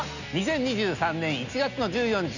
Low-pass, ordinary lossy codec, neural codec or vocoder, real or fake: 7.2 kHz; none; none; real